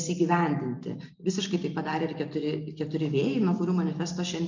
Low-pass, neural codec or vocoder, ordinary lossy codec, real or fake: 7.2 kHz; none; MP3, 64 kbps; real